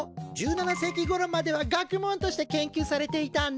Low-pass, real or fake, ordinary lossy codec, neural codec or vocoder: none; real; none; none